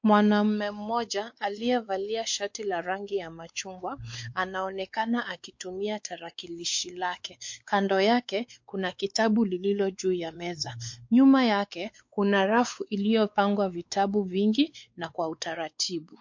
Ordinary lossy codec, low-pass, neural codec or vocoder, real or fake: MP3, 48 kbps; 7.2 kHz; codec, 16 kHz, 4 kbps, X-Codec, WavLM features, trained on Multilingual LibriSpeech; fake